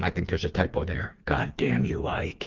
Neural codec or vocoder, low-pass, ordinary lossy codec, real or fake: codec, 16 kHz, 4 kbps, FreqCodec, smaller model; 7.2 kHz; Opus, 32 kbps; fake